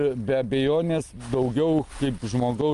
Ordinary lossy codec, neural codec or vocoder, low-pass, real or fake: Opus, 32 kbps; none; 10.8 kHz; real